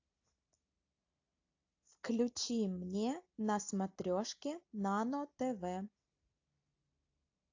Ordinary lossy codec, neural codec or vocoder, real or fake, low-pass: AAC, 48 kbps; none; real; 7.2 kHz